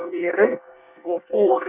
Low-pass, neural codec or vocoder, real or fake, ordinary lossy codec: 3.6 kHz; codec, 24 kHz, 1 kbps, SNAC; fake; none